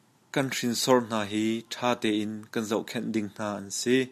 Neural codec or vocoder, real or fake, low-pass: none; real; 14.4 kHz